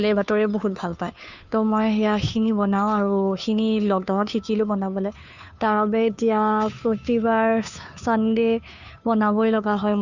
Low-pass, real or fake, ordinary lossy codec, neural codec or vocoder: 7.2 kHz; fake; none; codec, 16 kHz, 2 kbps, FunCodec, trained on Chinese and English, 25 frames a second